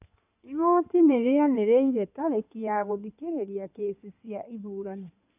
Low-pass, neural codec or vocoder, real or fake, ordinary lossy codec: 3.6 kHz; codec, 16 kHz in and 24 kHz out, 2.2 kbps, FireRedTTS-2 codec; fake; none